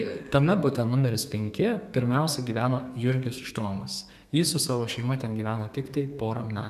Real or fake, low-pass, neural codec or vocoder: fake; 14.4 kHz; codec, 32 kHz, 1.9 kbps, SNAC